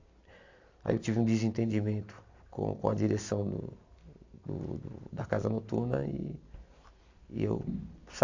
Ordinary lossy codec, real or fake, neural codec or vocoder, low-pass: AAC, 48 kbps; real; none; 7.2 kHz